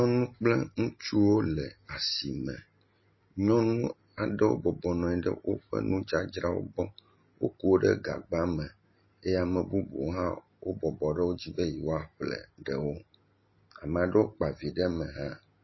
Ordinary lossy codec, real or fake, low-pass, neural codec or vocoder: MP3, 24 kbps; real; 7.2 kHz; none